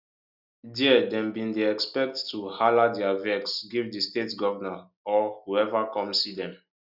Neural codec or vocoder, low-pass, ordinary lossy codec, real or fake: none; 5.4 kHz; none; real